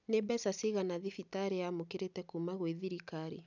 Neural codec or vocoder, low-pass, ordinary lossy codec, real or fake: none; 7.2 kHz; none; real